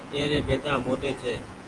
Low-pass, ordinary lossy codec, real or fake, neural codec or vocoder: 10.8 kHz; Opus, 24 kbps; fake; vocoder, 48 kHz, 128 mel bands, Vocos